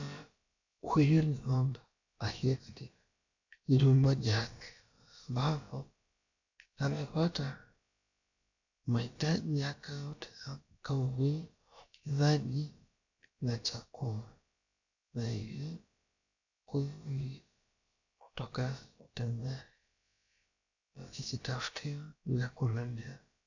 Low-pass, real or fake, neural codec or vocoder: 7.2 kHz; fake; codec, 16 kHz, about 1 kbps, DyCAST, with the encoder's durations